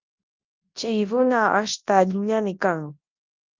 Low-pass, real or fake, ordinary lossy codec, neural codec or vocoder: 7.2 kHz; fake; Opus, 32 kbps; codec, 24 kHz, 0.9 kbps, WavTokenizer, large speech release